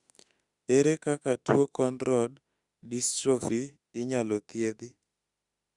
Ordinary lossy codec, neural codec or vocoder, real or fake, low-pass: Opus, 64 kbps; autoencoder, 48 kHz, 32 numbers a frame, DAC-VAE, trained on Japanese speech; fake; 10.8 kHz